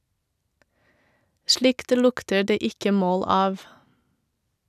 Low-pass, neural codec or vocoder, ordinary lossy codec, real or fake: 14.4 kHz; none; none; real